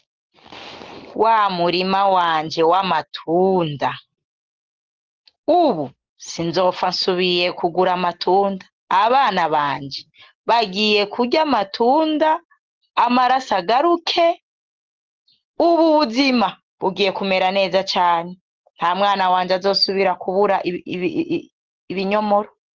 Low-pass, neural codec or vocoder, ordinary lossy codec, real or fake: 7.2 kHz; none; Opus, 16 kbps; real